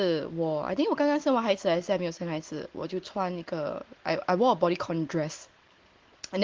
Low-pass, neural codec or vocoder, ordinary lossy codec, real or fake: 7.2 kHz; none; Opus, 16 kbps; real